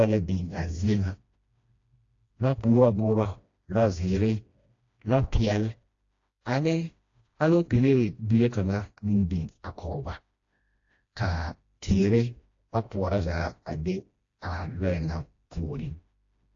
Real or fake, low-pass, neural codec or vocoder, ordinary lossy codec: fake; 7.2 kHz; codec, 16 kHz, 1 kbps, FreqCodec, smaller model; AAC, 48 kbps